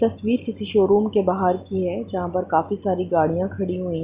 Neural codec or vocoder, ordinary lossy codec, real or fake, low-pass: none; Opus, 64 kbps; real; 3.6 kHz